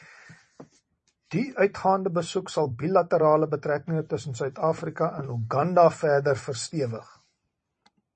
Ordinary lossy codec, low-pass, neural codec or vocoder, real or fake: MP3, 32 kbps; 10.8 kHz; vocoder, 44.1 kHz, 128 mel bands every 256 samples, BigVGAN v2; fake